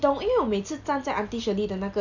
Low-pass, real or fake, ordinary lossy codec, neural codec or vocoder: 7.2 kHz; real; none; none